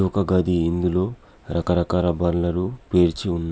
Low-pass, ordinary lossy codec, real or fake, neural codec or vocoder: none; none; real; none